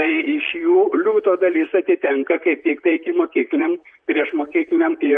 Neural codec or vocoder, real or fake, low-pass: vocoder, 44.1 kHz, 128 mel bands, Pupu-Vocoder; fake; 9.9 kHz